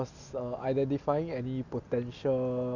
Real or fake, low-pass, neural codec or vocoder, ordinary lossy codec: real; 7.2 kHz; none; none